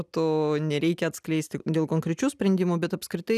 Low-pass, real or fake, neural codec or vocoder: 14.4 kHz; real; none